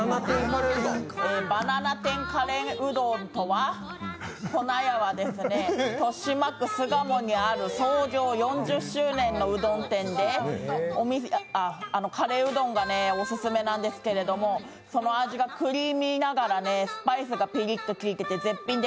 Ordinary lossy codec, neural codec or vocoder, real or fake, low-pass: none; none; real; none